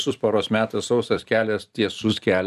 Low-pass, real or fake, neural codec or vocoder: 14.4 kHz; fake; vocoder, 44.1 kHz, 128 mel bands every 256 samples, BigVGAN v2